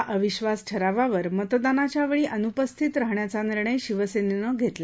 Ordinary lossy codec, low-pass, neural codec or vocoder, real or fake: none; none; none; real